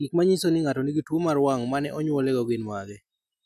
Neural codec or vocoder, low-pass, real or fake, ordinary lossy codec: none; 14.4 kHz; real; none